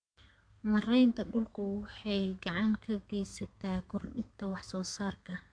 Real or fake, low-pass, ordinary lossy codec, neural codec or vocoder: fake; 9.9 kHz; MP3, 64 kbps; codec, 32 kHz, 1.9 kbps, SNAC